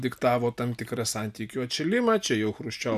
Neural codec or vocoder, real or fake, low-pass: none; real; 14.4 kHz